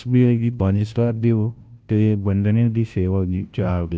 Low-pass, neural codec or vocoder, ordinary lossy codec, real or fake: none; codec, 16 kHz, 0.5 kbps, FunCodec, trained on Chinese and English, 25 frames a second; none; fake